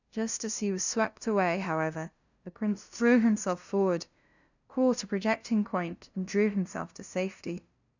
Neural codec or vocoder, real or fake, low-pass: codec, 16 kHz, 0.5 kbps, FunCodec, trained on LibriTTS, 25 frames a second; fake; 7.2 kHz